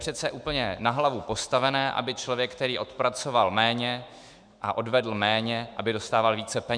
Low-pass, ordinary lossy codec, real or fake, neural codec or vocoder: 9.9 kHz; Opus, 64 kbps; fake; autoencoder, 48 kHz, 128 numbers a frame, DAC-VAE, trained on Japanese speech